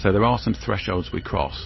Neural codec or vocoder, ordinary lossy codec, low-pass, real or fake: vocoder, 44.1 kHz, 128 mel bands every 512 samples, BigVGAN v2; MP3, 24 kbps; 7.2 kHz; fake